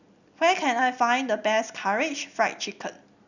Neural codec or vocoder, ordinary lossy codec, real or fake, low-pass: none; none; real; 7.2 kHz